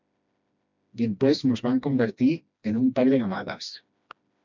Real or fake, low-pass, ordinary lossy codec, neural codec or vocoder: fake; 7.2 kHz; MP3, 64 kbps; codec, 16 kHz, 1 kbps, FreqCodec, smaller model